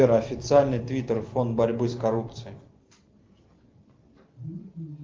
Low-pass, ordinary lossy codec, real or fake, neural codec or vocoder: 7.2 kHz; Opus, 32 kbps; real; none